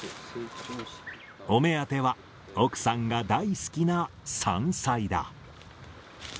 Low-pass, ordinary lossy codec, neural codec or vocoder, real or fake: none; none; none; real